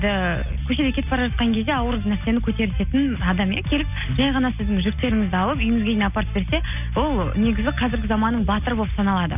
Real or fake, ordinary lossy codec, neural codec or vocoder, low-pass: real; none; none; 3.6 kHz